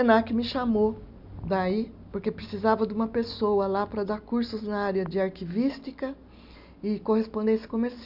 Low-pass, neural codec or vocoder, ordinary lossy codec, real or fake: 5.4 kHz; none; none; real